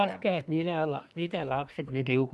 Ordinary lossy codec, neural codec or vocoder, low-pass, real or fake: none; codec, 24 kHz, 1 kbps, SNAC; none; fake